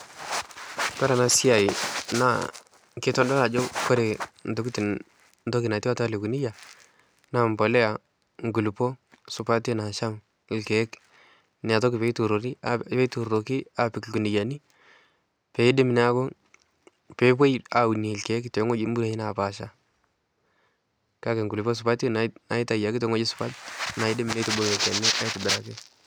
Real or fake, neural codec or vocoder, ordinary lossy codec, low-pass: real; none; none; none